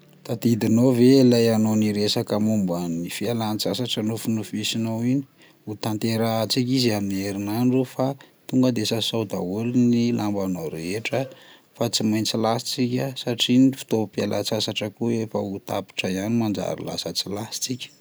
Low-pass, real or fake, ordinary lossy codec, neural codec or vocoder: none; real; none; none